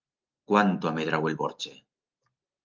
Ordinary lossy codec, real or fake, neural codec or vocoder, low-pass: Opus, 24 kbps; real; none; 7.2 kHz